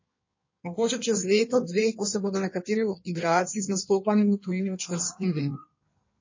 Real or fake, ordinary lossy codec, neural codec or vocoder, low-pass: fake; MP3, 32 kbps; codec, 16 kHz in and 24 kHz out, 1.1 kbps, FireRedTTS-2 codec; 7.2 kHz